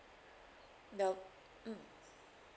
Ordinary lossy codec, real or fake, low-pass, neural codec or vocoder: none; real; none; none